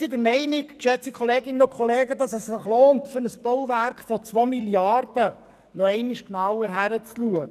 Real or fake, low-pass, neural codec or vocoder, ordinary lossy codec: fake; 14.4 kHz; codec, 44.1 kHz, 2.6 kbps, SNAC; none